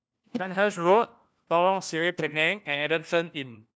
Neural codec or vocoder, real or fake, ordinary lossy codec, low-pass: codec, 16 kHz, 1 kbps, FunCodec, trained on LibriTTS, 50 frames a second; fake; none; none